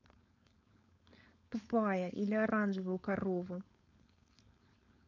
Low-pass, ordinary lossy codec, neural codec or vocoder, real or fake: 7.2 kHz; none; codec, 16 kHz, 4.8 kbps, FACodec; fake